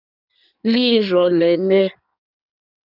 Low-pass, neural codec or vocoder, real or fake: 5.4 kHz; codec, 16 kHz in and 24 kHz out, 1.1 kbps, FireRedTTS-2 codec; fake